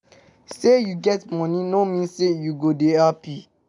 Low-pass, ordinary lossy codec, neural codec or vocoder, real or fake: 10.8 kHz; none; none; real